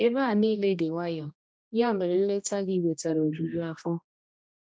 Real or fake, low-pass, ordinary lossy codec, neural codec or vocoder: fake; none; none; codec, 16 kHz, 1 kbps, X-Codec, HuBERT features, trained on general audio